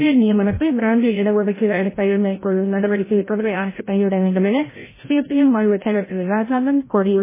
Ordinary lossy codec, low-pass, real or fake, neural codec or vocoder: MP3, 16 kbps; 3.6 kHz; fake; codec, 16 kHz, 0.5 kbps, FreqCodec, larger model